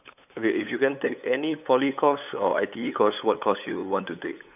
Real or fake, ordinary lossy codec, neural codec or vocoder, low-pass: fake; none; codec, 16 kHz, 8 kbps, FunCodec, trained on LibriTTS, 25 frames a second; 3.6 kHz